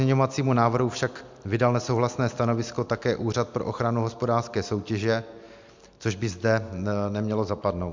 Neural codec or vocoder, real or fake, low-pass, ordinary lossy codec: none; real; 7.2 kHz; MP3, 64 kbps